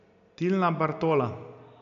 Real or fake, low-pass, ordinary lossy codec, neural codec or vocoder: real; 7.2 kHz; none; none